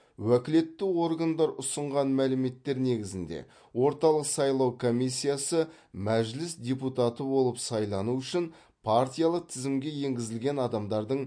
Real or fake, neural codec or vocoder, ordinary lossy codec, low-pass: real; none; MP3, 48 kbps; 9.9 kHz